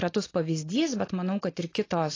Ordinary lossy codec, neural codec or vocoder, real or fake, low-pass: AAC, 32 kbps; none; real; 7.2 kHz